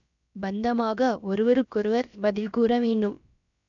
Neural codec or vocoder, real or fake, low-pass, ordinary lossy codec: codec, 16 kHz, about 1 kbps, DyCAST, with the encoder's durations; fake; 7.2 kHz; none